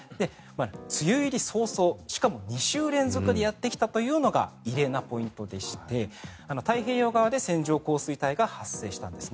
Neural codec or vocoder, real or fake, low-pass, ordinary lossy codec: none; real; none; none